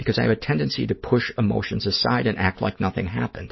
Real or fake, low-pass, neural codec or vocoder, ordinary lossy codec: real; 7.2 kHz; none; MP3, 24 kbps